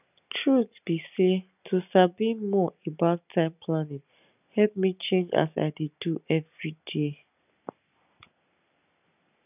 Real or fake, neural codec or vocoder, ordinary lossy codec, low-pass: real; none; none; 3.6 kHz